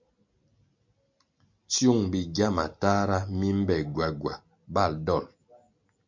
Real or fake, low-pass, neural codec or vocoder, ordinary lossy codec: real; 7.2 kHz; none; MP3, 48 kbps